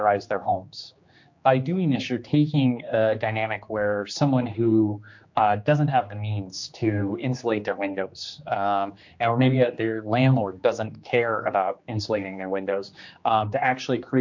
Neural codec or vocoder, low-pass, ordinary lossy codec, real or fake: codec, 16 kHz, 2 kbps, X-Codec, HuBERT features, trained on general audio; 7.2 kHz; MP3, 48 kbps; fake